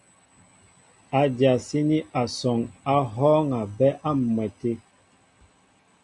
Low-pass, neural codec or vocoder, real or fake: 10.8 kHz; none; real